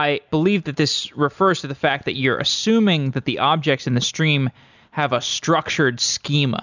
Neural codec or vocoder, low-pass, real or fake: none; 7.2 kHz; real